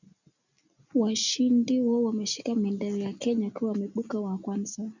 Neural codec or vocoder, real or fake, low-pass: none; real; 7.2 kHz